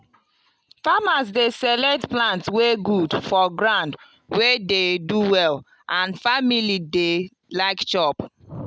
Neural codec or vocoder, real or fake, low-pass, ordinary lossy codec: none; real; none; none